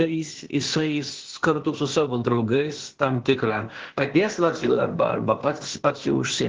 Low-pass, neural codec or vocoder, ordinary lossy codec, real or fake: 7.2 kHz; codec, 16 kHz, 0.8 kbps, ZipCodec; Opus, 16 kbps; fake